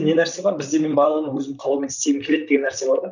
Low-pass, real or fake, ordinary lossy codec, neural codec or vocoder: 7.2 kHz; fake; none; vocoder, 44.1 kHz, 128 mel bands, Pupu-Vocoder